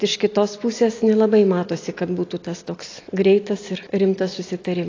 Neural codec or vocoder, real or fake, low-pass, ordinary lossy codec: none; real; 7.2 kHz; AAC, 32 kbps